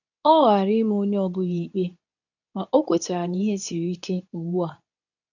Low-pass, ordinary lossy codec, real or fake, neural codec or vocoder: 7.2 kHz; none; fake; codec, 24 kHz, 0.9 kbps, WavTokenizer, medium speech release version 2